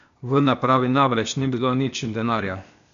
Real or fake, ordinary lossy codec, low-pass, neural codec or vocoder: fake; AAC, 96 kbps; 7.2 kHz; codec, 16 kHz, 0.8 kbps, ZipCodec